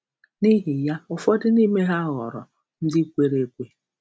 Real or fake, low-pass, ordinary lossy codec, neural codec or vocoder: real; none; none; none